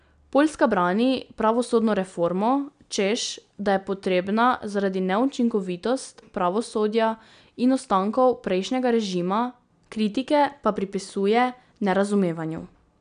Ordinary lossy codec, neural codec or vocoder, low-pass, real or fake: none; none; 9.9 kHz; real